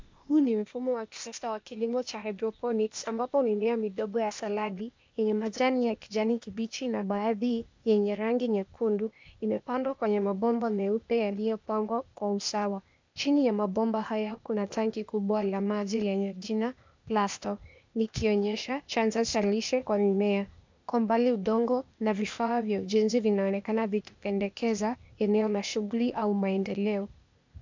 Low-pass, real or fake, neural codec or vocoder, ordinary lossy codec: 7.2 kHz; fake; codec, 16 kHz, 0.8 kbps, ZipCodec; MP3, 64 kbps